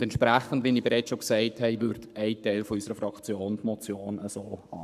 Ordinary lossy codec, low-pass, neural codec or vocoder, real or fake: none; 14.4 kHz; codec, 44.1 kHz, 7.8 kbps, Pupu-Codec; fake